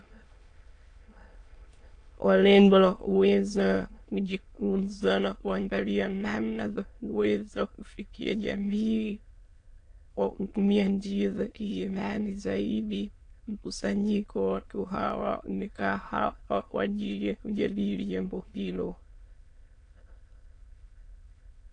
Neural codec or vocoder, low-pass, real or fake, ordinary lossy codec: autoencoder, 22.05 kHz, a latent of 192 numbers a frame, VITS, trained on many speakers; 9.9 kHz; fake; AAC, 48 kbps